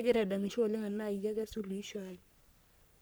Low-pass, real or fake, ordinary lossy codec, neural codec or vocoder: none; fake; none; codec, 44.1 kHz, 3.4 kbps, Pupu-Codec